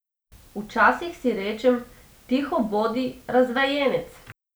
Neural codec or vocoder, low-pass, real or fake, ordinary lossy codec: none; none; real; none